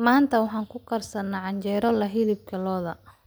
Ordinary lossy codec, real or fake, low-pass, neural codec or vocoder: none; real; none; none